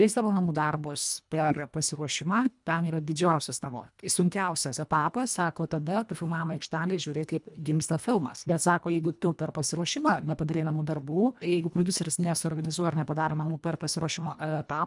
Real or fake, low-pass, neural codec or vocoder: fake; 10.8 kHz; codec, 24 kHz, 1.5 kbps, HILCodec